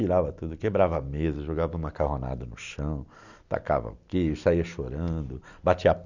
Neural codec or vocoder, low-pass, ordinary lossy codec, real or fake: none; 7.2 kHz; none; real